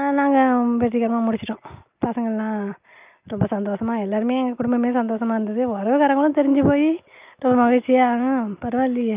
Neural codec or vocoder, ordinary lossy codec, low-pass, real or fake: none; Opus, 24 kbps; 3.6 kHz; real